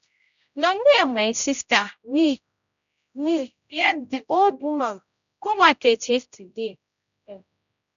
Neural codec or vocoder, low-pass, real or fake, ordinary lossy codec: codec, 16 kHz, 0.5 kbps, X-Codec, HuBERT features, trained on general audio; 7.2 kHz; fake; none